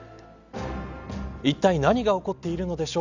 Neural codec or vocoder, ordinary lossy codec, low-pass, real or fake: none; none; 7.2 kHz; real